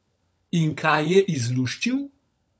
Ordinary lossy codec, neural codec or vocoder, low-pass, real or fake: none; codec, 16 kHz, 16 kbps, FunCodec, trained on LibriTTS, 50 frames a second; none; fake